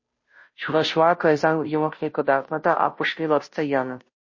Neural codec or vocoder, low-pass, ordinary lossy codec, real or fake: codec, 16 kHz, 0.5 kbps, FunCodec, trained on Chinese and English, 25 frames a second; 7.2 kHz; MP3, 32 kbps; fake